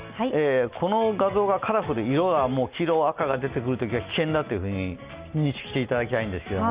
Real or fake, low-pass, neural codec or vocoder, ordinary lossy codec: real; 3.6 kHz; none; Opus, 64 kbps